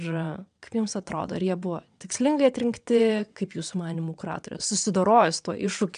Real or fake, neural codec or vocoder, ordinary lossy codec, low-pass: fake; vocoder, 22.05 kHz, 80 mel bands, WaveNeXt; AAC, 96 kbps; 9.9 kHz